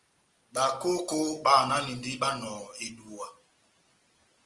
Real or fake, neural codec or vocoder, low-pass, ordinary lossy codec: real; none; 10.8 kHz; Opus, 24 kbps